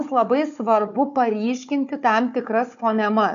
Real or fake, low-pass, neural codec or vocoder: fake; 7.2 kHz; codec, 16 kHz, 4 kbps, FunCodec, trained on Chinese and English, 50 frames a second